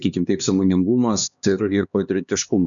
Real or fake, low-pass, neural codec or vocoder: fake; 7.2 kHz; codec, 16 kHz, 2 kbps, X-Codec, HuBERT features, trained on LibriSpeech